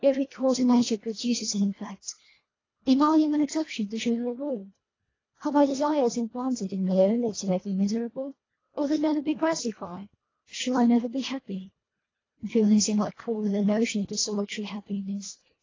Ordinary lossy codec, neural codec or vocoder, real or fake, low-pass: AAC, 32 kbps; codec, 24 kHz, 1.5 kbps, HILCodec; fake; 7.2 kHz